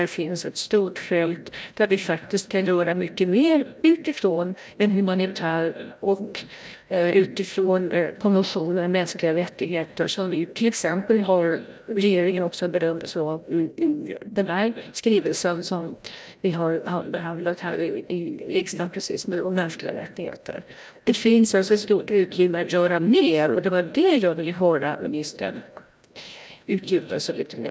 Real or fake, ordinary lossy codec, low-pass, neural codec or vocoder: fake; none; none; codec, 16 kHz, 0.5 kbps, FreqCodec, larger model